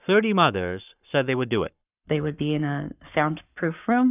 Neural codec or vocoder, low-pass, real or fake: codec, 44.1 kHz, 7.8 kbps, Pupu-Codec; 3.6 kHz; fake